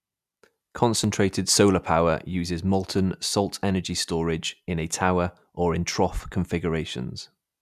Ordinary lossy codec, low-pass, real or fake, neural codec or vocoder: none; 14.4 kHz; real; none